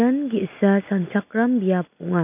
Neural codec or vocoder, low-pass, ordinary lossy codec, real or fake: none; 3.6 kHz; none; real